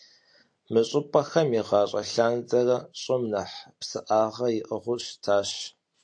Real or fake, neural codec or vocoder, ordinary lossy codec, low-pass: real; none; AAC, 64 kbps; 9.9 kHz